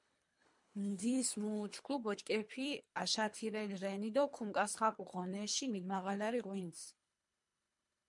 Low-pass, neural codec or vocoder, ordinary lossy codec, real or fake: 10.8 kHz; codec, 24 kHz, 3 kbps, HILCodec; MP3, 64 kbps; fake